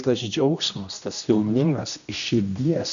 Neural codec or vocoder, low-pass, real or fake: codec, 16 kHz, 1 kbps, X-Codec, HuBERT features, trained on general audio; 7.2 kHz; fake